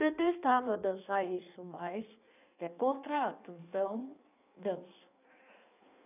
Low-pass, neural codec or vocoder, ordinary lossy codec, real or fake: 3.6 kHz; codec, 16 kHz in and 24 kHz out, 1.1 kbps, FireRedTTS-2 codec; none; fake